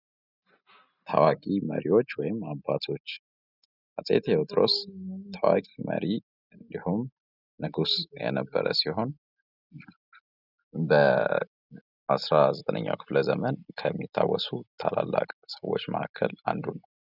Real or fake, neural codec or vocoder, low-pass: real; none; 5.4 kHz